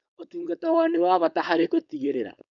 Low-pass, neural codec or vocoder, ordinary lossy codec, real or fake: 7.2 kHz; codec, 16 kHz, 4.8 kbps, FACodec; none; fake